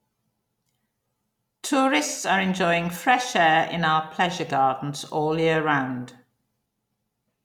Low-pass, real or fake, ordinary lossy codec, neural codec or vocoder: 19.8 kHz; real; none; none